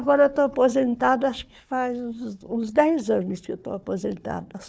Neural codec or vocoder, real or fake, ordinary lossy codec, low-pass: codec, 16 kHz, 4 kbps, FunCodec, trained on Chinese and English, 50 frames a second; fake; none; none